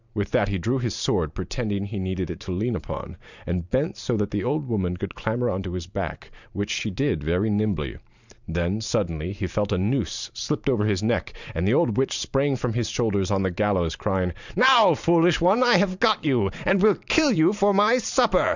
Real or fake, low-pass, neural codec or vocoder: fake; 7.2 kHz; vocoder, 44.1 kHz, 128 mel bands every 512 samples, BigVGAN v2